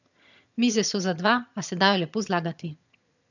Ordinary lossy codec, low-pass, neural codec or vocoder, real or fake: none; 7.2 kHz; vocoder, 22.05 kHz, 80 mel bands, HiFi-GAN; fake